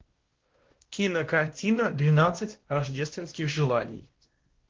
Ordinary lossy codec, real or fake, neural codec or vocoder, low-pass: Opus, 16 kbps; fake; codec, 16 kHz, 1 kbps, X-Codec, WavLM features, trained on Multilingual LibriSpeech; 7.2 kHz